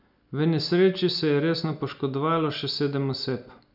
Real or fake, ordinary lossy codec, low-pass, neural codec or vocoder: real; none; 5.4 kHz; none